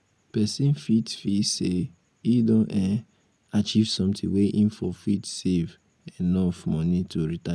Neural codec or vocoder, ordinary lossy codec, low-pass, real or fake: none; none; none; real